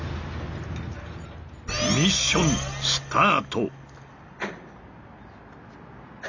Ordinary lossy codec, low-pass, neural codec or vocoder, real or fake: none; 7.2 kHz; none; real